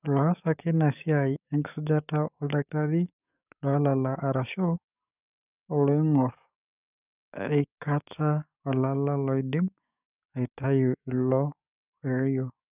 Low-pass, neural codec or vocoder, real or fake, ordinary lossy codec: 3.6 kHz; codec, 44.1 kHz, 7.8 kbps, DAC; fake; none